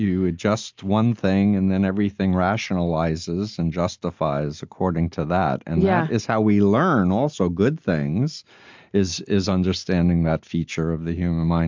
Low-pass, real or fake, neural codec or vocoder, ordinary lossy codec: 7.2 kHz; real; none; MP3, 64 kbps